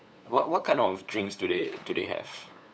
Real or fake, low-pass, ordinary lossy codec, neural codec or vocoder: fake; none; none; codec, 16 kHz, 8 kbps, FunCodec, trained on LibriTTS, 25 frames a second